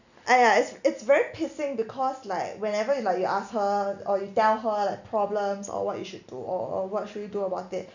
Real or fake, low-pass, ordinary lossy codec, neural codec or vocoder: real; 7.2 kHz; none; none